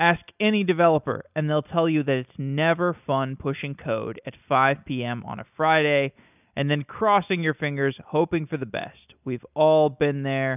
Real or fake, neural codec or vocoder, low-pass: real; none; 3.6 kHz